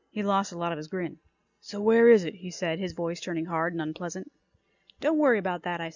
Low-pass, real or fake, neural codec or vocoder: 7.2 kHz; real; none